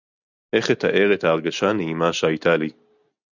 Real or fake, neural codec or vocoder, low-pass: real; none; 7.2 kHz